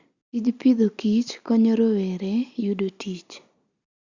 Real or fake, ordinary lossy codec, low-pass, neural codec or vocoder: real; Opus, 64 kbps; 7.2 kHz; none